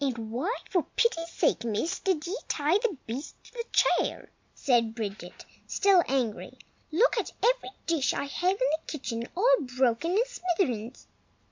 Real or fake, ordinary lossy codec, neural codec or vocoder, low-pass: real; MP3, 64 kbps; none; 7.2 kHz